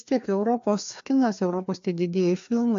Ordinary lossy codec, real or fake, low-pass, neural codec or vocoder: AAC, 64 kbps; fake; 7.2 kHz; codec, 16 kHz, 2 kbps, FreqCodec, larger model